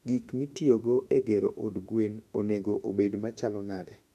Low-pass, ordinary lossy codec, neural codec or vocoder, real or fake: 14.4 kHz; none; autoencoder, 48 kHz, 32 numbers a frame, DAC-VAE, trained on Japanese speech; fake